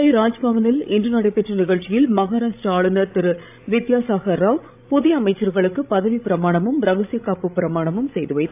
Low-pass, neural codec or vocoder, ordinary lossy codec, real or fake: 3.6 kHz; codec, 16 kHz, 16 kbps, FreqCodec, larger model; AAC, 32 kbps; fake